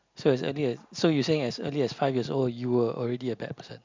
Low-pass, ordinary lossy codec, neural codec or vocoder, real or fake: 7.2 kHz; MP3, 64 kbps; none; real